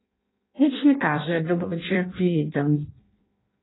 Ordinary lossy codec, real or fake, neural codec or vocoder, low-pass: AAC, 16 kbps; fake; codec, 16 kHz in and 24 kHz out, 0.6 kbps, FireRedTTS-2 codec; 7.2 kHz